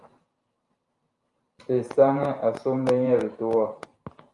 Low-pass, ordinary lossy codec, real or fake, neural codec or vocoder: 10.8 kHz; Opus, 32 kbps; fake; vocoder, 44.1 kHz, 128 mel bands every 512 samples, BigVGAN v2